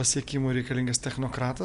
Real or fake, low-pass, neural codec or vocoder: real; 10.8 kHz; none